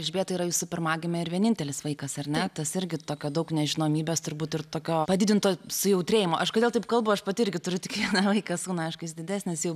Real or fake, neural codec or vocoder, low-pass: real; none; 14.4 kHz